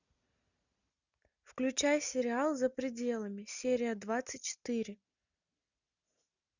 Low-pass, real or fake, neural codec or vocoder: 7.2 kHz; real; none